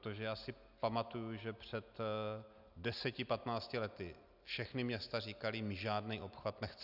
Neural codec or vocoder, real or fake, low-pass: none; real; 5.4 kHz